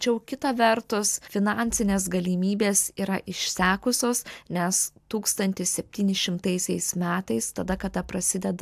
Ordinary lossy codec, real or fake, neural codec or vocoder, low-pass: AAC, 96 kbps; real; none; 14.4 kHz